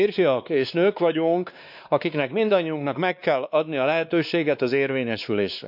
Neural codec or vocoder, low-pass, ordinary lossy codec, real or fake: codec, 16 kHz, 2 kbps, X-Codec, WavLM features, trained on Multilingual LibriSpeech; 5.4 kHz; none; fake